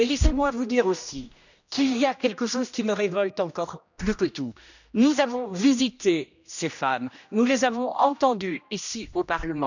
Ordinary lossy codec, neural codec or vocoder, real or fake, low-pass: none; codec, 16 kHz, 1 kbps, X-Codec, HuBERT features, trained on general audio; fake; 7.2 kHz